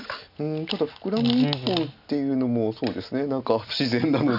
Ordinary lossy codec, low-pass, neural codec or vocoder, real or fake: none; 5.4 kHz; none; real